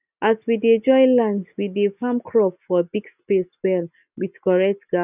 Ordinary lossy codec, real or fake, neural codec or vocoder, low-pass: none; real; none; 3.6 kHz